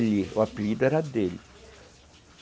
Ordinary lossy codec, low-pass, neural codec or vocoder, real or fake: none; none; none; real